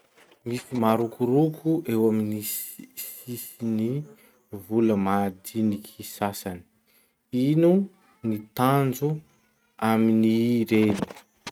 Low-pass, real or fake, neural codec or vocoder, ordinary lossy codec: 19.8 kHz; real; none; none